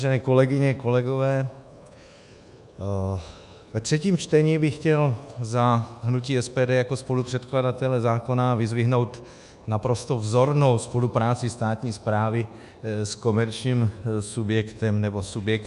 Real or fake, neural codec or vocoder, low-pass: fake; codec, 24 kHz, 1.2 kbps, DualCodec; 10.8 kHz